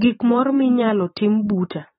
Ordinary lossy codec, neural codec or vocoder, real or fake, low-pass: AAC, 16 kbps; vocoder, 44.1 kHz, 128 mel bands every 512 samples, BigVGAN v2; fake; 19.8 kHz